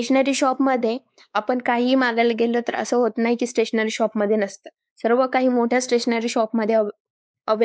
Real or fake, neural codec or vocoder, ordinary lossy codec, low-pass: fake; codec, 16 kHz, 4 kbps, X-Codec, WavLM features, trained on Multilingual LibriSpeech; none; none